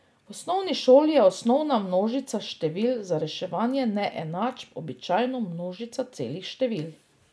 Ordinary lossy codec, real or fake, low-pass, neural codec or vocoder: none; real; none; none